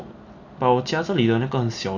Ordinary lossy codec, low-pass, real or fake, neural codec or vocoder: none; 7.2 kHz; real; none